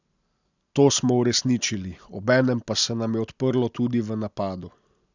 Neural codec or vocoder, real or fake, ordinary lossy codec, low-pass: none; real; none; 7.2 kHz